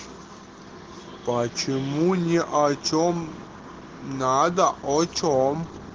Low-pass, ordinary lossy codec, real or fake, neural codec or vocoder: 7.2 kHz; Opus, 16 kbps; real; none